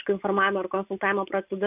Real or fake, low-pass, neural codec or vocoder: real; 3.6 kHz; none